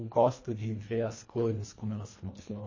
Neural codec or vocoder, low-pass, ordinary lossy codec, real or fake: codec, 24 kHz, 1.5 kbps, HILCodec; 7.2 kHz; MP3, 32 kbps; fake